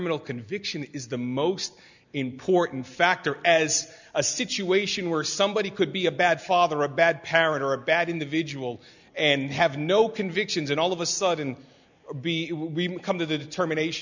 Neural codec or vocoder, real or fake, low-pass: none; real; 7.2 kHz